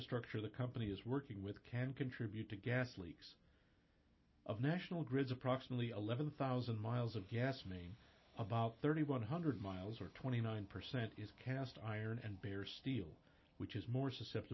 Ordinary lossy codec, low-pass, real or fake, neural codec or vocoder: MP3, 24 kbps; 7.2 kHz; real; none